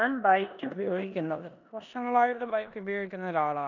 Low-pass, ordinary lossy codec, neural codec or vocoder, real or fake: 7.2 kHz; none; codec, 16 kHz in and 24 kHz out, 0.9 kbps, LongCat-Audio-Codec, four codebook decoder; fake